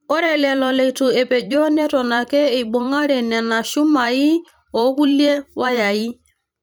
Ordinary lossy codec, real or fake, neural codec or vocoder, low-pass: none; fake; vocoder, 44.1 kHz, 128 mel bands every 512 samples, BigVGAN v2; none